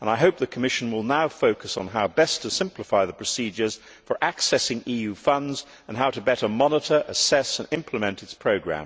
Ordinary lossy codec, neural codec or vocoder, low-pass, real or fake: none; none; none; real